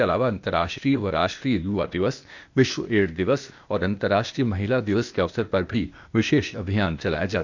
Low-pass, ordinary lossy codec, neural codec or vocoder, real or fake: 7.2 kHz; none; codec, 16 kHz, 0.8 kbps, ZipCodec; fake